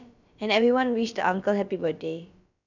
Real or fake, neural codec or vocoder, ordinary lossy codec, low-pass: fake; codec, 16 kHz, about 1 kbps, DyCAST, with the encoder's durations; none; 7.2 kHz